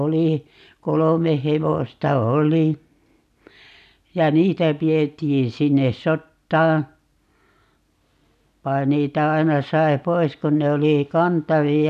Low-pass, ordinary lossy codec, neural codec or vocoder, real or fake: 14.4 kHz; none; none; real